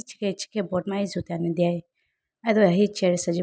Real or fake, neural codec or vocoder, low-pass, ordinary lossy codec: real; none; none; none